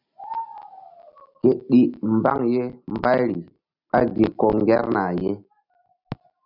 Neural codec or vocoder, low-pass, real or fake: none; 5.4 kHz; real